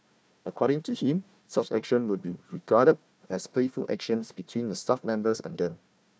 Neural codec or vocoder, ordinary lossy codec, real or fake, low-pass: codec, 16 kHz, 1 kbps, FunCodec, trained on Chinese and English, 50 frames a second; none; fake; none